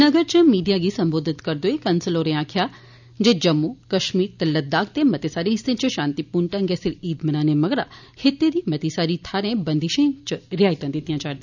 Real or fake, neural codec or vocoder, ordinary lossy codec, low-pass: real; none; none; 7.2 kHz